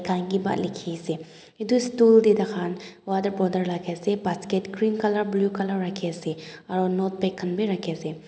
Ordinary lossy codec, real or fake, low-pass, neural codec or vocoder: none; real; none; none